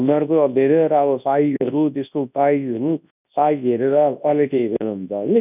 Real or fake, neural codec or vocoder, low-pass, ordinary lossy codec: fake; codec, 24 kHz, 0.9 kbps, WavTokenizer, large speech release; 3.6 kHz; none